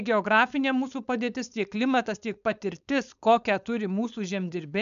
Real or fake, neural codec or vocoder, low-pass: fake; codec, 16 kHz, 4.8 kbps, FACodec; 7.2 kHz